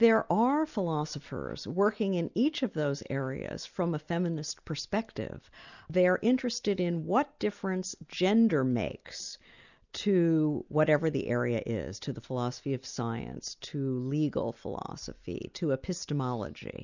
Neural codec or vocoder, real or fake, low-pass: none; real; 7.2 kHz